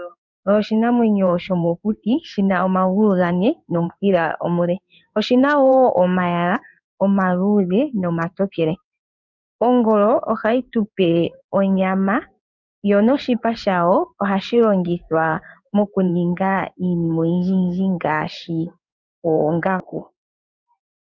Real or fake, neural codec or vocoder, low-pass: fake; codec, 16 kHz in and 24 kHz out, 1 kbps, XY-Tokenizer; 7.2 kHz